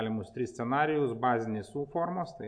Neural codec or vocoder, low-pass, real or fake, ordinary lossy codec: none; 9.9 kHz; real; AAC, 64 kbps